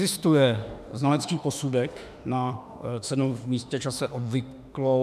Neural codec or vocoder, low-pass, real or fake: autoencoder, 48 kHz, 32 numbers a frame, DAC-VAE, trained on Japanese speech; 14.4 kHz; fake